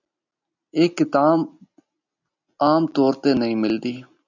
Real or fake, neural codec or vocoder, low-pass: real; none; 7.2 kHz